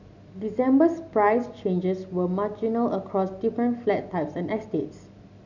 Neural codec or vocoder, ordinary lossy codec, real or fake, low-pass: none; none; real; 7.2 kHz